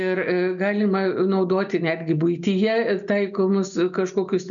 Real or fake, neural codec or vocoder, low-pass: real; none; 7.2 kHz